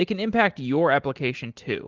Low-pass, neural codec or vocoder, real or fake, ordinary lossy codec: 7.2 kHz; none; real; Opus, 16 kbps